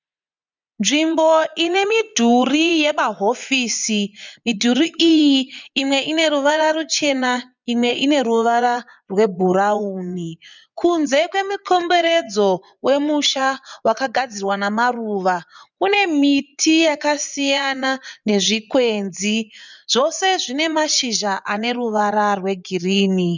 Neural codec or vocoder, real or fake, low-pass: vocoder, 24 kHz, 100 mel bands, Vocos; fake; 7.2 kHz